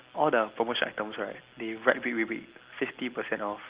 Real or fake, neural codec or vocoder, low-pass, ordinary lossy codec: real; none; 3.6 kHz; Opus, 16 kbps